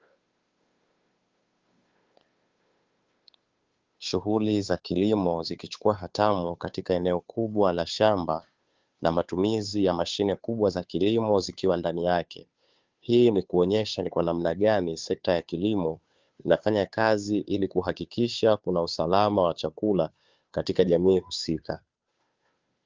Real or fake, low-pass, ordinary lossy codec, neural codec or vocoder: fake; 7.2 kHz; Opus, 32 kbps; codec, 16 kHz, 2 kbps, FunCodec, trained on Chinese and English, 25 frames a second